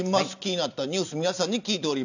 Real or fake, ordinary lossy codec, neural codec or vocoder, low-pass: real; none; none; 7.2 kHz